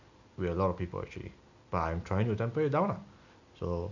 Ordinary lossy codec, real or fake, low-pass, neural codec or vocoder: none; real; 7.2 kHz; none